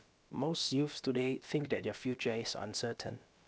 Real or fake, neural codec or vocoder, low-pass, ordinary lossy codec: fake; codec, 16 kHz, about 1 kbps, DyCAST, with the encoder's durations; none; none